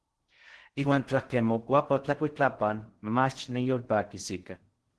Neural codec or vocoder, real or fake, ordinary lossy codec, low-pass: codec, 16 kHz in and 24 kHz out, 0.6 kbps, FocalCodec, streaming, 2048 codes; fake; Opus, 16 kbps; 10.8 kHz